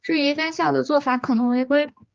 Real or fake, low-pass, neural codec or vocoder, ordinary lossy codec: fake; 7.2 kHz; codec, 16 kHz, 2 kbps, X-Codec, HuBERT features, trained on general audio; Opus, 24 kbps